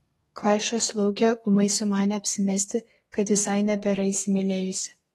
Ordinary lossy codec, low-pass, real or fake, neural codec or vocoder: AAC, 32 kbps; 14.4 kHz; fake; codec, 32 kHz, 1.9 kbps, SNAC